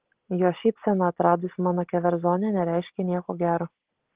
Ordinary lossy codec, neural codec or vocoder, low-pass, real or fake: Opus, 16 kbps; none; 3.6 kHz; real